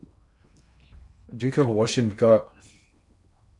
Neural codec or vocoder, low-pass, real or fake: codec, 16 kHz in and 24 kHz out, 0.8 kbps, FocalCodec, streaming, 65536 codes; 10.8 kHz; fake